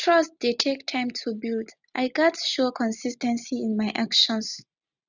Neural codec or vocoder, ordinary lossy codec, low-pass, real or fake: none; none; 7.2 kHz; real